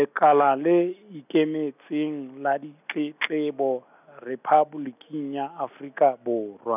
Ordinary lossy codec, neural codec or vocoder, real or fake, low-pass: none; none; real; 3.6 kHz